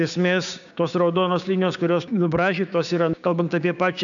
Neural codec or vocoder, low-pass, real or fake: codec, 16 kHz, 6 kbps, DAC; 7.2 kHz; fake